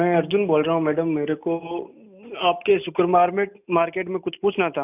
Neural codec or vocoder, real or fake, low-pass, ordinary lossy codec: none; real; 3.6 kHz; none